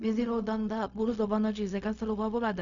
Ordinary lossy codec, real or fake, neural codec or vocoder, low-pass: none; fake; codec, 16 kHz, 0.4 kbps, LongCat-Audio-Codec; 7.2 kHz